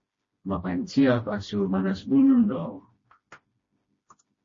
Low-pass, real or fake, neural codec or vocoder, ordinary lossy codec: 7.2 kHz; fake; codec, 16 kHz, 1 kbps, FreqCodec, smaller model; MP3, 32 kbps